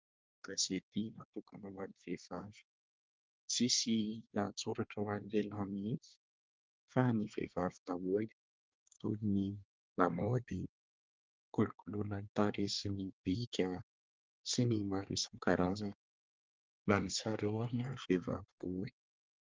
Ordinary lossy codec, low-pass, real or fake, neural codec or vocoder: Opus, 32 kbps; 7.2 kHz; fake; codec, 24 kHz, 1 kbps, SNAC